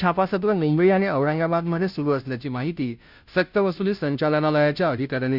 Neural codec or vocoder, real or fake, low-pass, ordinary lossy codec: codec, 16 kHz, 0.5 kbps, FunCodec, trained on Chinese and English, 25 frames a second; fake; 5.4 kHz; Opus, 64 kbps